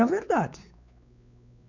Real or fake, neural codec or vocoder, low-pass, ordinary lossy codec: fake; codec, 16 kHz, 4 kbps, X-Codec, WavLM features, trained on Multilingual LibriSpeech; 7.2 kHz; none